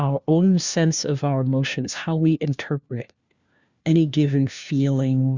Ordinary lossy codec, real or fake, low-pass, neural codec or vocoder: Opus, 64 kbps; fake; 7.2 kHz; codec, 16 kHz, 1 kbps, FunCodec, trained on LibriTTS, 50 frames a second